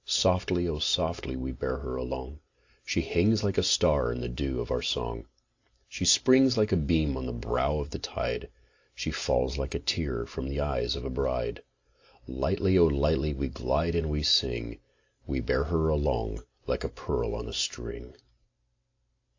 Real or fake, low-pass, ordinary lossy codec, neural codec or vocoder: real; 7.2 kHz; AAC, 48 kbps; none